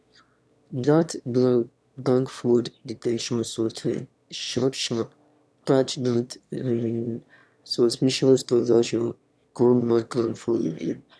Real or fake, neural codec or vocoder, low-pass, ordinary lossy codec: fake; autoencoder, 22.05 kHz, a latent of 192 numbers a frame, VITS, trained on one speaker; none; none